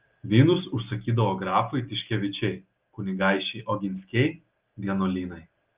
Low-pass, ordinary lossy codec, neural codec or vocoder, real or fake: 3.6 kHz; Opus, 32 kbps; none; real